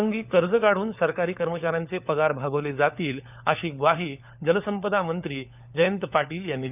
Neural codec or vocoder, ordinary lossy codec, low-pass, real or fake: codec, 16 kHz, 4 kbps, FunCodec, trained on LibriTTS, 50 frames a second; none; 3.6 kHz; fake